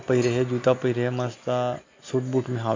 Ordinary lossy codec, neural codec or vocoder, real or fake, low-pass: MP3, 48 kbps; none; real; 7.2 kHz